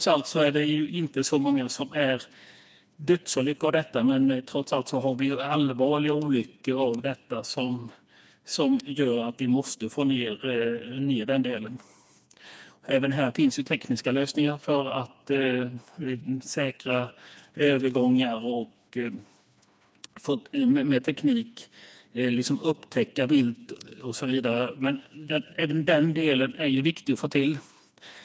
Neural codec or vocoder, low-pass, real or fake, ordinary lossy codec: codec, 16 kHz, 2 kbps, FreqCodec, smaller model; none; fake; none